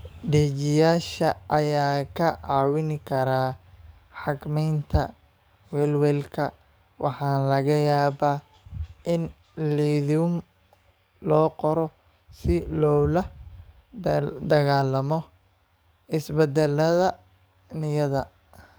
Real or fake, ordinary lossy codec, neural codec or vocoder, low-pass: fake; none; codec, 44.1 kHz, 7.8 kbps, DAC; none